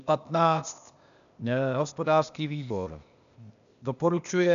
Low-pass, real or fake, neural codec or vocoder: 7.2 kHz; fake; codec, 16 kHz, 0.8 kbps, ZipCodec